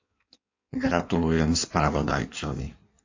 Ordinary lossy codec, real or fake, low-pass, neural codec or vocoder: AAC, 48 kbps; fake; 7.2 kHz; codec, 16 kHz in and 24 kHz out, 1.1 kbps, FireRedTTS-2 codec